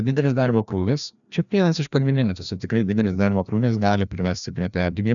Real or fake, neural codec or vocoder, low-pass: fake; codec, 16 kHz, 1 kbps, FreqCodec, larger model; 7.2 kHz